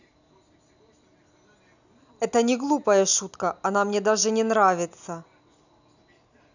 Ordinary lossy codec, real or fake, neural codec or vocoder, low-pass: none; real; none; 7.2 kHz